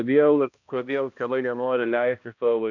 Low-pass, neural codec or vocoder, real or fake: 7.2 kHz; codec, 16 kHz, 1 kbps, X-Codec, HuBERT features, trained on balanced general audio; fake